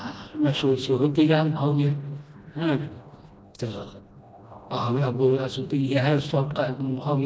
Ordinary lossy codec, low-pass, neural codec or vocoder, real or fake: none; none; codec, 16 kHz, 1 kbps, FreqCodec, smaller model; fake